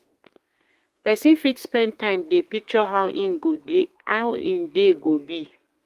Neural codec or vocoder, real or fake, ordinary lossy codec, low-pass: codec, 32 kHz, 1.9 kbps, SNAC; fake; Opus, 32 kbps; 14.4 kHz